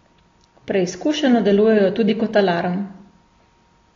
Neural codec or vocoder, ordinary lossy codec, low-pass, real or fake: none; AAC, 32 kbps; 7.2 kHz; real